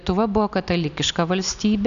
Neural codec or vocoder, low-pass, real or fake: none; 7.2 kHz; real